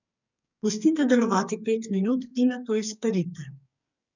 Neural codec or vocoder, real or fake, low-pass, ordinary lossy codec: codec, 44.1 kHz, 2.6 kbps, SNAC; fake; 7.2 kHz; none